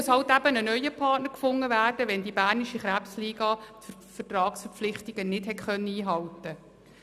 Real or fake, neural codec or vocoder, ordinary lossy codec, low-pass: real; none; none; 14.4 kHz